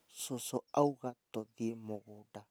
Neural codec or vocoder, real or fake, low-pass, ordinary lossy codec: none; real; none; none